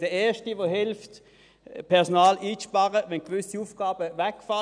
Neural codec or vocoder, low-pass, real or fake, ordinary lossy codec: none; 9.9 kHz; real; none